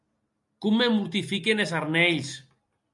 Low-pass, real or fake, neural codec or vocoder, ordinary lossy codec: 10.8 kHz; real; none; MP3, 96 kbps